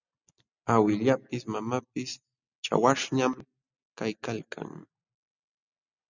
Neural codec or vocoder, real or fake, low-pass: none; real; 7.2 kHz